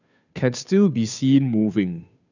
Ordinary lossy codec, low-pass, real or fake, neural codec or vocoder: none; 7.2 kHz; fake; codec, 16 kHz, 2 kbps, FunCodec, trained on Chinese and English, 25 frames a second